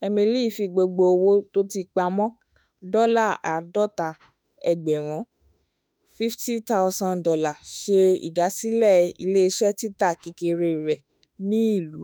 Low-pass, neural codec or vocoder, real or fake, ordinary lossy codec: none; autoencoder, 48 kHz, 32 numbers a frame, DAC-VAE, trained on Japanese speech; fake; none